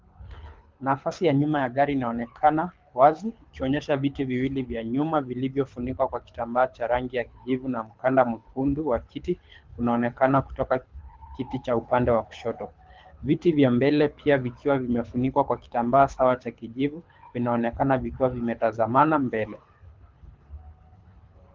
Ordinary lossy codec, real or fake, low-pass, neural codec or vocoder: Opus, 32 kbps; fake; 7.2 kHz; codec, 24 kHz, 6 kbps, HILCodec